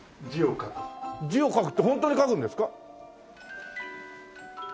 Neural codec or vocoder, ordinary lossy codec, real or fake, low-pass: none; none; real; none